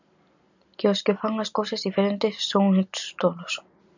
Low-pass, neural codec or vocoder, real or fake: 7.2 kHz; none; real